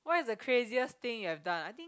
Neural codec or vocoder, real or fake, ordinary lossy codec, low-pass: none; real; none; none